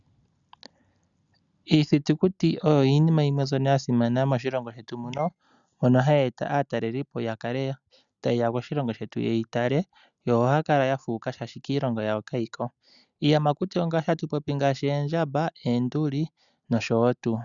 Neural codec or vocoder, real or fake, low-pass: none; real; 7.2 kHz